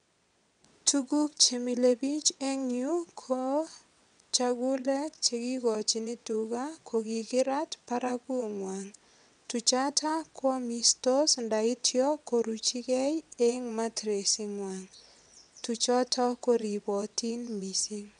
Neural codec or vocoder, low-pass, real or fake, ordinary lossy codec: vocoder, 22.05 kHz, 80 mel bands, WaveNeXt; 9.9 kHz; fake; none